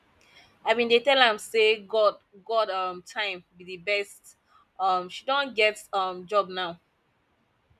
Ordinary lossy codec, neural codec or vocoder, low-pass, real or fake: none; none; 14.4 kHz; real